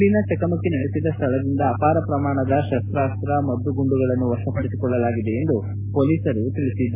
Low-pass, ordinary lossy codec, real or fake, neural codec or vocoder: 3.6 kHz; MP3, 24 kbps; real; none